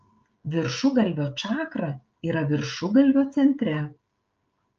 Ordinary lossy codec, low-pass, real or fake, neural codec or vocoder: Opus, 24 kbps; 7.2 kHz; fake; codec, 16 kHz, 16 kbps, FreqCodec, smaller model